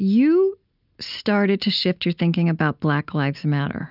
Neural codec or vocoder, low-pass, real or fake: none; 5.4 kHz; real